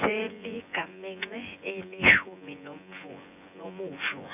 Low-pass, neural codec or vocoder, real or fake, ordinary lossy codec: 3.6 kHz; vocoder, 24 kHz, 100 mel bands, Vocos; fake; none